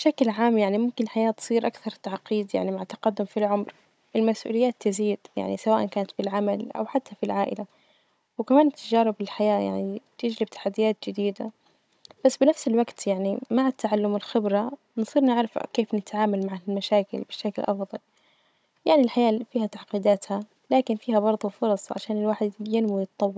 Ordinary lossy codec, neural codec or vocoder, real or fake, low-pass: none; codec, 16 kHz, 16 kbps, FunCodec, trained on Chinese and English, 50 frames a second; fake; none